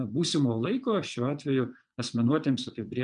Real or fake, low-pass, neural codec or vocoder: fake; 9.9 kHz; vocoder, 22.05 kHz, 80 mel bands, WaveNeXt